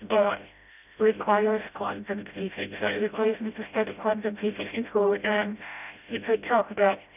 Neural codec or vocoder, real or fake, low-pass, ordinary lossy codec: codec, 16 kHz, 0.5 kbps, FreqCodec, smaller model; fake; 3.6 kHz; none